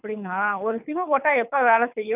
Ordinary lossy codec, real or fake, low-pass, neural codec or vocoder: none; fake; 3.6 kHz; codec, 24 kHz, 6 kbps, HILCodec